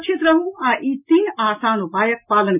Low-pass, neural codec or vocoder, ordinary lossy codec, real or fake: 3.6 kHz; none; none; real